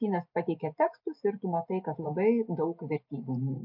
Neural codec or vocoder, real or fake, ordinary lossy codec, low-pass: none; real; MP3, 48 kbps; 5.4 kHz